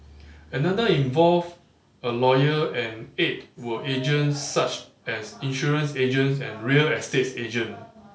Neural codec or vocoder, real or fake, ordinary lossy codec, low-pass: none; real; none; none